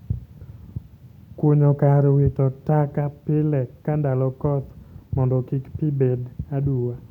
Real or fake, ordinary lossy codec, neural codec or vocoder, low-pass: real; none; none; 19.8 kHz